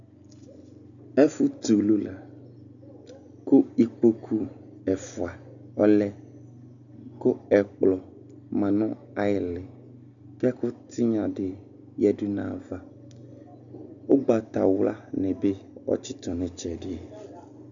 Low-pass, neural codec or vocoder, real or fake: 7.2 kHz; none; real